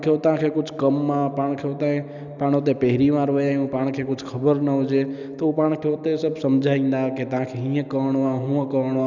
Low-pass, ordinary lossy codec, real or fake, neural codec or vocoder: 7.2 kHz; none; real; none